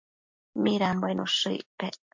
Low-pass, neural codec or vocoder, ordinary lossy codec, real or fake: 7.2 kHz; none; MP3, 48 kbps; real